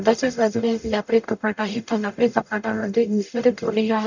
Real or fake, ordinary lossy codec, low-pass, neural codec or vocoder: fake; none; 7.2 kHz; codec, 44.1 kHz, 0.9 kbps, DAC